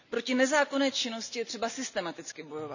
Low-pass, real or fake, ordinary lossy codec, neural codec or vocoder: 7.2 kHz; real; none; none